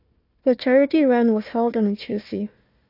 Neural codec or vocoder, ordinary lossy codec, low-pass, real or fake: codec, 16 kHz, 1 kbps, FunCodec, trained on Chinese and English, 50 frames a second; AAC, 32 kbps; 5.4 kHz; fake